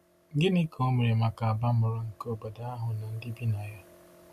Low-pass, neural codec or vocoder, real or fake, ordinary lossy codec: 14.4 kHz; none; real; none